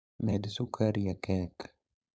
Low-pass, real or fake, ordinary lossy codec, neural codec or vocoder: none; fake; none; codec, 16 kHz, 4.8 kbps, FACodec